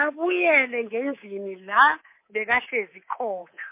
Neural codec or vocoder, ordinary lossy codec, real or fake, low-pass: codec, 24 kHz, 3.1 kbps, DualCodec; MP3, 24 kbps; fake; 3.6 kHz